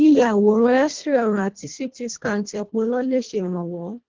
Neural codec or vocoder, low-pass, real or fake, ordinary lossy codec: codec, 24 kHz, 1.5 kbps, HILCodec; 7.2 kHz; fake; Opus, 16 kbps